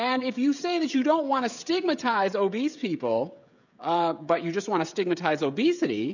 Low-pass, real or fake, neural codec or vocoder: 7.2 kHz; fake; codec, 16 kHz, 16 kbps, FreqCodec, smaller model